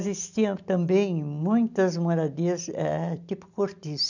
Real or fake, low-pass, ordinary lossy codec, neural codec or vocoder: real; 7.2 kHz; none; none